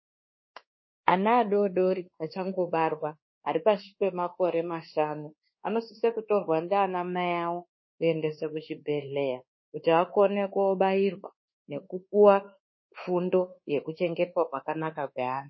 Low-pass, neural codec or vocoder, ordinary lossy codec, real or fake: 7.2 kHz; codec, 24 kHz, 1.2 kbps, DualCodec; MP3, 24 kbps; fake